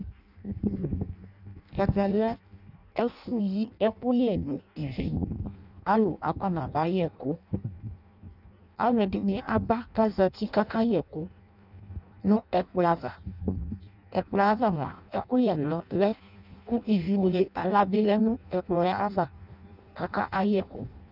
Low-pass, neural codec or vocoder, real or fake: 5.4 kHz; codec, 16 kHz in and 24 kHz out, 0.6 kbps, FireRedTTS-2 codec; fake